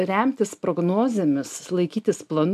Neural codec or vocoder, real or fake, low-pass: none; real; 14.4 kHz